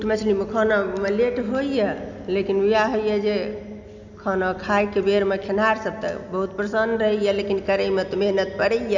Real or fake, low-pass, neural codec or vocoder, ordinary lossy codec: real; 7.2 kHz; none; none